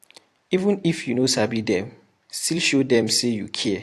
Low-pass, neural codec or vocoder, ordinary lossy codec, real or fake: 14.4 kHz; vocoder, 44.1 kHz, 128 mel bands every 256 samples, BigVGAN v2; AAC, 64 kbps; fake